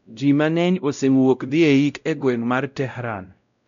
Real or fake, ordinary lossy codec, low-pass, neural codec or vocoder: fake; none; 7.2 kHz; codec, 16 kHz, 0.5 kbps, X-Codec, WavLM features, trained on Multilingual LibriSpeech